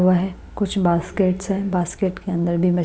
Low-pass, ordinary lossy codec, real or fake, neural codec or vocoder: none; none; real; none